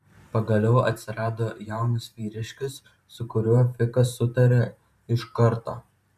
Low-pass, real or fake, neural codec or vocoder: 14.4 kHz; fake; vocoder, 44.1 kHz, 128 mel bands every 512 samples, BigVGAN v2